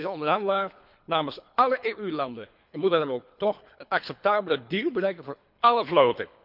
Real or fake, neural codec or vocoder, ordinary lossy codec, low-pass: fake; codec, 24 kHz, 3 kbps, HILCodec; none; 5.4 kHz